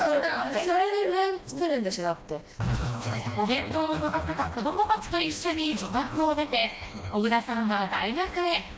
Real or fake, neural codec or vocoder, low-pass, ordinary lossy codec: fake; codec, 16 kHz, 1 kbps, FreqCodec, smaller model; none; none